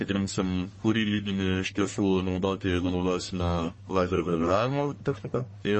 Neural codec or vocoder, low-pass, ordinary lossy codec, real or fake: codec, 44.1 kHz, 1.7 kbps, Pupu-Codec; 10.8 kHz; MP3, 32 kbps; fake